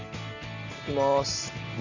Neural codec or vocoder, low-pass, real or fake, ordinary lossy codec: none; 7.2 kHz; real; none